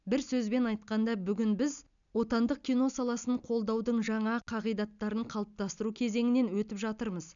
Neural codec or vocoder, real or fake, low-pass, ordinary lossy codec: none; real; 7.2 kHz; none